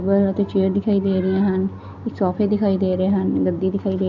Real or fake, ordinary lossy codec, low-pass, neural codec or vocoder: real; none; 7.2 kHz; none